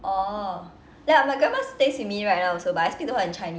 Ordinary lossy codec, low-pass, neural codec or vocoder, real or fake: none; none; none; real